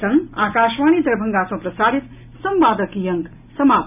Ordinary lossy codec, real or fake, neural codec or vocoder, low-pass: none; real; none; 3.6 kHz